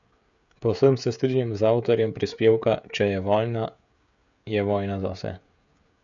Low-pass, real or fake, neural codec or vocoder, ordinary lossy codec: 7.2 kHz; fake; codec, 16 kHz, 16 kbps, FreqCodec, smaller model; none